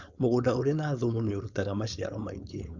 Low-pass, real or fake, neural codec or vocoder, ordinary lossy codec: 7.2 kHz; fake; codec, 16 kHz, 4.8 kbps, FACodec; Opus, 64 kbps